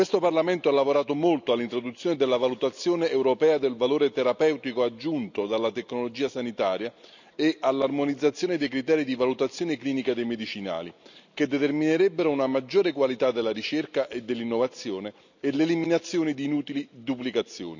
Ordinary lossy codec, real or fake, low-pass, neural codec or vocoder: none; real; 7.2 kHz; none